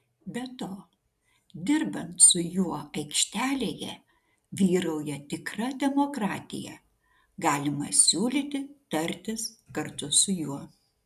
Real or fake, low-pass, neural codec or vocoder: real; 14.4 kHz; none